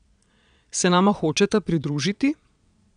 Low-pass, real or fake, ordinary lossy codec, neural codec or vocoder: 9.9 kHz; fake; none; vocoder, 22.05 kHz, 80 mel bands, Vocos